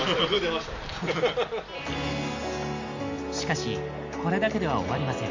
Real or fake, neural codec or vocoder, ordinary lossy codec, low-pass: real; none; MP3, 64 kbps; 7.2 kHz